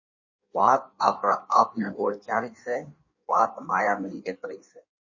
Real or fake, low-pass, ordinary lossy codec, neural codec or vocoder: fake; 7.2 kHz; MP3, 32 kbps; codec, 16 kHz in and 24 kHz out, 1.1 kbps, FireRedTTS-2 codec